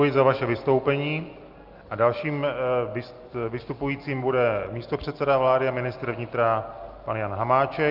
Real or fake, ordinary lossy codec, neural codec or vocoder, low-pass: real; Opus, 32 kbps; none; 5.4 kHz